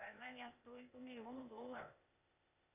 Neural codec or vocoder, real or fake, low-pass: codec, 16 kHz, 0.8 kbps, ZipCodec; fake; 3.6 kHz